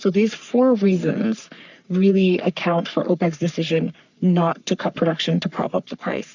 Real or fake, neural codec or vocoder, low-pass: fake; codec, 44.1 kHz, 3.4 kbps, Pupu-Codec; 7.2 kHz